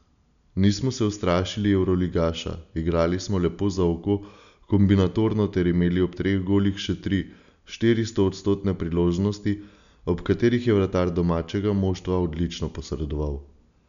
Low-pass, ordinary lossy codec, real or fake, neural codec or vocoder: 7.2 kHz; none; real; none